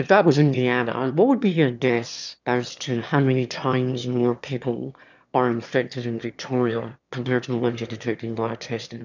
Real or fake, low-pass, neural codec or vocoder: fake; 7.2 kHz; autoencoder, 22.05 kHz, a latent of 192 numbers a frame, VITS, trained on one speaker